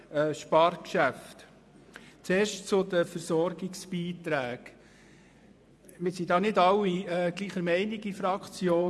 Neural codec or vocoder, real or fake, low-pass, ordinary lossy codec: vocoder, 24 kHz, 100 mel bands, Vocos; fake; none; none